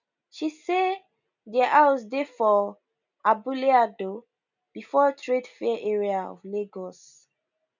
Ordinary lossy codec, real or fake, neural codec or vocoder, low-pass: none; real; none; 7.2 kHz